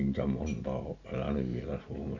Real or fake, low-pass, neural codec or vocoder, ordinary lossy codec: real; 7.2 kHz; none; none